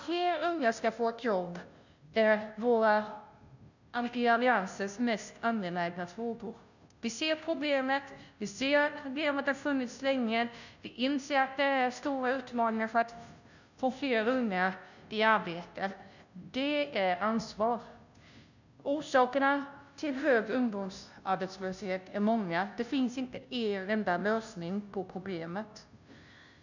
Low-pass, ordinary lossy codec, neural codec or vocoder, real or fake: 7.2 kHz; none; codec, 16 kHz, 0.5 kbps, FunCodec, trained on Chinese and English, 25 frames a second; fake